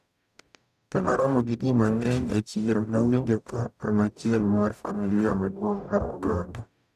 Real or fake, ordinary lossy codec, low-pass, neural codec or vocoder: fake; none; 14.4 kHz; codec, 44.1 kHz, 0.9 kbps, DAC